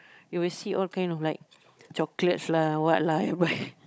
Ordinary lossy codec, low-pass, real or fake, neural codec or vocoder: none; none; fake; codec, 16 kHz, 16 kbps, FunCodec, trained on Chinese and English, 50 frames a second